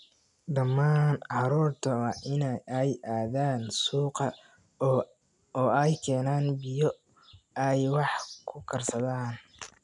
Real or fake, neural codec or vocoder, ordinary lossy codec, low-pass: real; none; none; 10.8 kHz